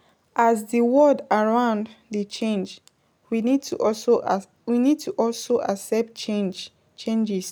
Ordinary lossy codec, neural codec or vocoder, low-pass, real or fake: none; none; none; real